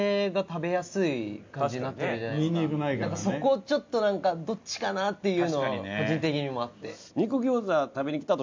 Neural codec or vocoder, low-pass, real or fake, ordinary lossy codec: none; 7.2 kHz; real; MP3, 64 kbps